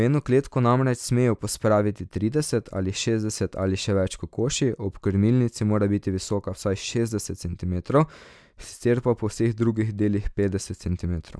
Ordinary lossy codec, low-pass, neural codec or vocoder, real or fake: none; none; none; real